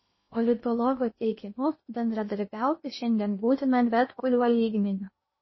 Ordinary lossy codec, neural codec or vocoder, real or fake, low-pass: MP3, 24 kbps; codec, 16 kHz in and 24 kHz out, 0.8 kbps, FocalCodec, streaming, 65536 codes; fake; 7.2 kHz